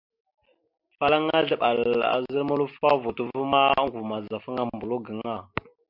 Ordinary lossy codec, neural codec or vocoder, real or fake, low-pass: MP3, 48 kbps; none; real; 5.4 kHz